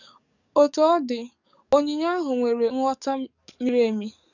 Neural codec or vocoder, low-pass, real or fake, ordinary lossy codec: codec, 44.1 kHz, 7.8 kbps, DAC; 7.2 kHz; fake; none